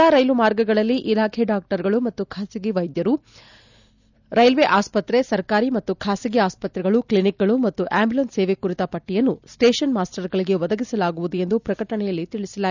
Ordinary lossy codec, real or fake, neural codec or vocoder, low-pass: none; real; none; 7.2 kHz